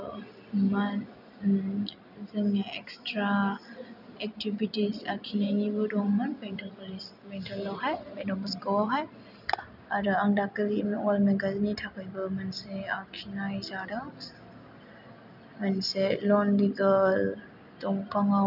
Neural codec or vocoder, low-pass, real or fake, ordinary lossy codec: none; 5.4 kHz; real; none